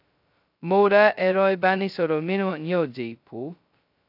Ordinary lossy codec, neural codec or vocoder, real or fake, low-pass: AAC, 48 kbps; codec, 16 kHz, 0.2 kbps, FocalCodec; fake; 5.4 kHz